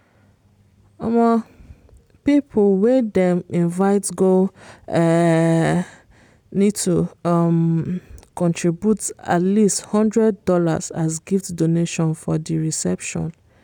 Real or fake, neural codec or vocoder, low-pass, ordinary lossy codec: real; none; 19.8 kHz; none